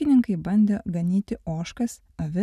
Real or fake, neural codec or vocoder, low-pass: real; none; 14.4 kHz